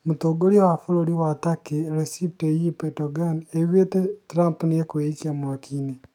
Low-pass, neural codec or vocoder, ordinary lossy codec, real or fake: 19.8 kHz; codec, 44.1 kHz, 7.8 kbps, DAC; none; fake